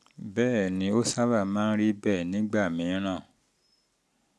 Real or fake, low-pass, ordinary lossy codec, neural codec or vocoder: real; none; none; none